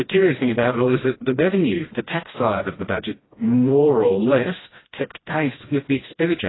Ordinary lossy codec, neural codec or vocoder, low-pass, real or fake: AAC, 16 kbps; codec, 16 kHz, 1 kbps, FreqCodec, smaller model; 7.2 kHz; fake